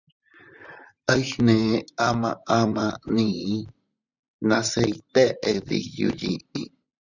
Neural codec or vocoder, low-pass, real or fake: vocoder, 44.1 kHz, 128 mel bands, Pupu-Vocoder; 7.2 kHz; fake